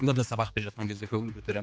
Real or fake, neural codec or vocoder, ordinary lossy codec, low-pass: fake; codec, 16 kHz, 2 kbps, X-Codec, HuBERT features, trained on general audio; none; none